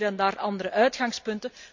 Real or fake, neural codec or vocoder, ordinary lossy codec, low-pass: real; none; MP3, 64 kbps; 7.2 kHz